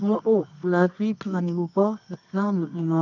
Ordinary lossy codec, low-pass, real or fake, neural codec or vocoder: none; 7.2 kHz; fake; codec, 24 kHz, 0.9 kbps, WavTokenizer, medium music audio release